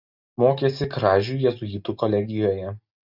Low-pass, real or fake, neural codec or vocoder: 5.4 kHz; real; none